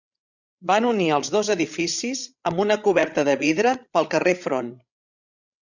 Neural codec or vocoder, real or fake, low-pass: codec, 16 kHz, 16 kbps, FreqCodec, larger model; fake; 7.2 kHz